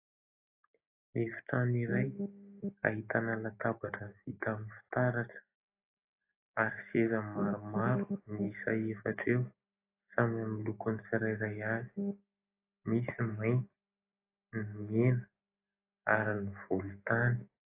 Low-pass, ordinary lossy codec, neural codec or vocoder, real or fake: 3.6 kHz; AAC, 24 kbps; none; real